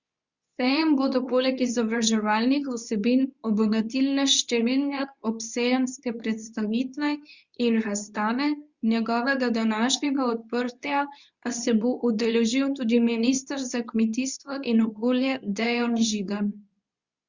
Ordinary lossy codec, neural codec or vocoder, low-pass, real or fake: Opus, 64 kbps; codec, 24 kHz, 0.9 kbps, WavTokenizer, medium speech release version 2; 7.2 kHz; fake